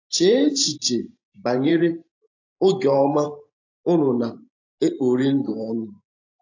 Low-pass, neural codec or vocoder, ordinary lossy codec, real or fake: 7.2 kHz; vocoder, 44.1 kHz, 128 mel bands every 512 samples, BigVGAN v2; AAC, 48 kbps; fake